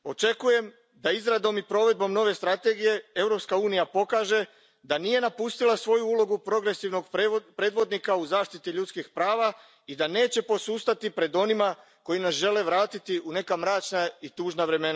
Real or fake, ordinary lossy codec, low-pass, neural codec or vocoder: real; none; none; none